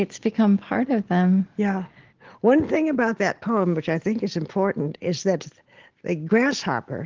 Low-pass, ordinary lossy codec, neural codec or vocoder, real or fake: 7.2 kHz; Opus, 16 kbps; none; real